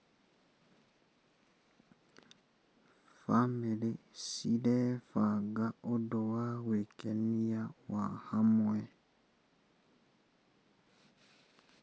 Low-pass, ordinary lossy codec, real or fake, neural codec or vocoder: none; none; real; none